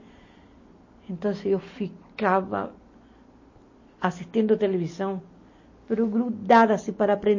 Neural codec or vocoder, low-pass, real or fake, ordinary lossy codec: none; 7.2 kHz; real; MP3, 32 kbps